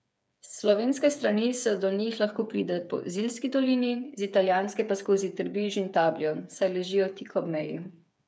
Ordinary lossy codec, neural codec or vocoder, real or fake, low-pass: none; codec, 16 kHz, 8 kbps, FreqCodec, smaller model; fake; none